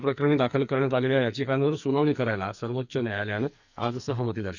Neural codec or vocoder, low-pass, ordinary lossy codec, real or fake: codec, 44.1 kHz, 2.6 kbps, SNAC; 7.2 kHz; none; fake